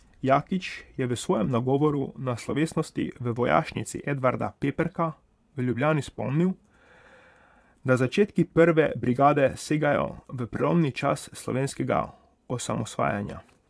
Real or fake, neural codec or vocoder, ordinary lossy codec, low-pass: fake; vocoder, 22.05 kHz, 80 mel bands, Vocos; none; none